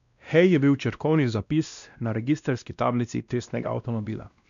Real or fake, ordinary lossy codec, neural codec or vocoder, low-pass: fake; none; codec, 16 kHz, 1 kbps, X-Codec, WavLM features, trained on Multilingual LibriSpeech; 7.2 kHz